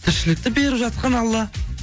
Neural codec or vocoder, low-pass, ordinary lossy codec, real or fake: none; none; none; real